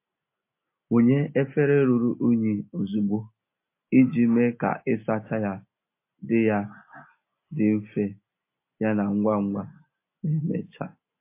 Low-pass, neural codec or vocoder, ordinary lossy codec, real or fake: 3.6 kHz; none; MP3, 24 kbps; real